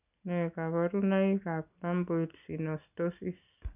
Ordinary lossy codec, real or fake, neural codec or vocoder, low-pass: none; real; none; 3.6 kHz